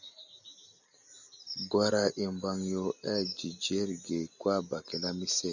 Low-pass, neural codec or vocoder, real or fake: 7.2 kHz; none; real